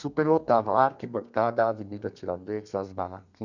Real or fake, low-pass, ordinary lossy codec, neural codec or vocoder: fake; 7.2 kHz; none; codec, 32 kHz, 1.9 kbps, SNAC